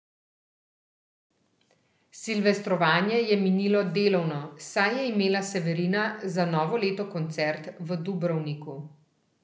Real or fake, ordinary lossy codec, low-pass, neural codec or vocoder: real; none; none; none